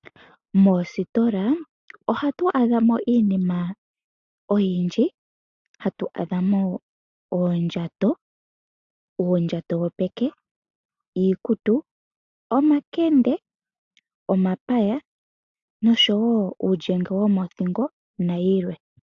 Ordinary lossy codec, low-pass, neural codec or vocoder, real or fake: AAC, 64 kbps; 7.2 kHz; none; real